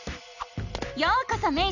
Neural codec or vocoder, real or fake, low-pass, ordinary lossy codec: none; real; 7.2 kHz; none